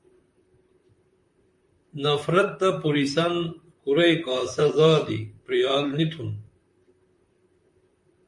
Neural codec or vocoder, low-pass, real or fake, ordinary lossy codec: vocoder, 44.1 kHz, 128 mel bands, Pupu-Vocoder; 10.8 kHz; fake; MP3, 48 kbps